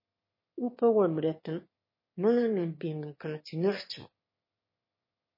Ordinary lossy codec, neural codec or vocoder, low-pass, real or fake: MP3, 24 kbps; autoencoder, 22.05 kHz, a latent of 192 numbers a frame, VITS, trained on one speaker; 5.4 kHz; fake